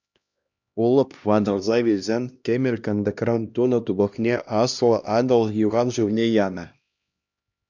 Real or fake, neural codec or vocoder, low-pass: fake; codec, 16 kHz, 1 kbps, X-Codec, HuBERT features, trained on LibriSpeech; 7.2 kHz